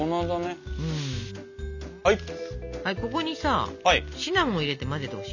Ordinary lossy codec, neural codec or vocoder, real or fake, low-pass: none; none; real; 7.2 kHz